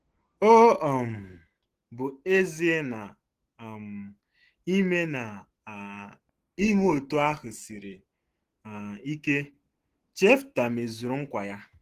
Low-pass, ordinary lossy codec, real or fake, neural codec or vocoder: 14.4 kHz; Opus, 16 kbps; fake; autoencoder, 48 kHz, 128 numbers a frame, DAC-VAE, trained on Japanese speech